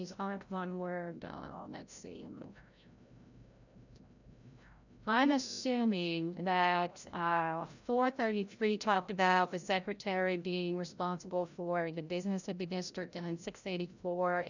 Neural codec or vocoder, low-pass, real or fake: codec, 16 kHz, 0.5 kbps, FreqCodec, larger model; 7.2 kHz; fake